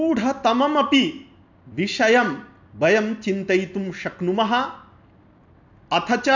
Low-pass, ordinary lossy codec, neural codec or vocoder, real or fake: 7.2 kHz; none; none; real